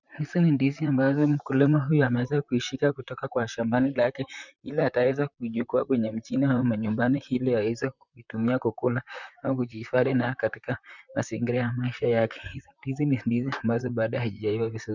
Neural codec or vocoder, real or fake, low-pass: vocoder, 44.1 kHz, 128 mel bands, Pupu-Vocoder; fake; 7.2 kHz